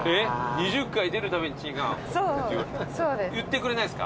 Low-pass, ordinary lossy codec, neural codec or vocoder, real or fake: none; none; none; real